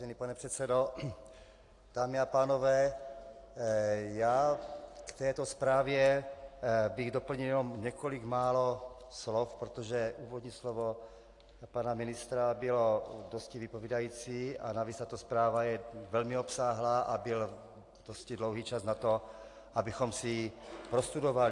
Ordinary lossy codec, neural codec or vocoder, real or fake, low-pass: AAC, 48 kbps; none; real; 10.8 kHz